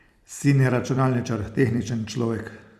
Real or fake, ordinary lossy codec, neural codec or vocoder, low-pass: fake; Opus, 64 kbps; vocoder, 44.1 kHz, 128 mel bands every 256 samples, BigVGAN v2; 14.4 kHz